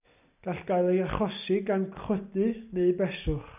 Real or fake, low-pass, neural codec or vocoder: real; 3.6 kHz; none